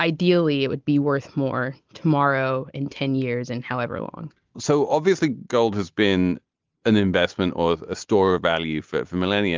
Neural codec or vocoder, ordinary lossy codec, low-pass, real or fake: none; Opus, 32 kbps; 7.2 kHz; real